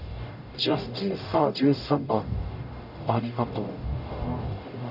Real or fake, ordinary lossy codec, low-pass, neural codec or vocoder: fake; none; 5.4 kHz; codec, 44.1 kHz, 0.9 kbps, DAC